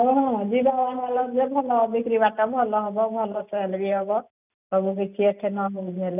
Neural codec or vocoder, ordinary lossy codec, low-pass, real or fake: none; none; 3.6 kHz; real